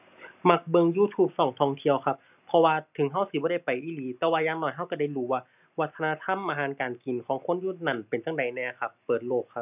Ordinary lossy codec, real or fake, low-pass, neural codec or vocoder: none; real; 3.6 kHz; none